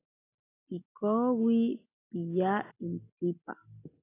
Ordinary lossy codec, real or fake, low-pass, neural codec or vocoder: AAC, 16 kbps; real; 3.6 kHz; none